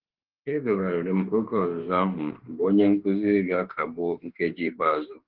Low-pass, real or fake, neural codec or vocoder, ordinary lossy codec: 5.4 kHz; fake; autoencoder, 48 kHz, 32 numbers a frame, DAC-VAE, trained on Japanese speech; Opus, 16 kbps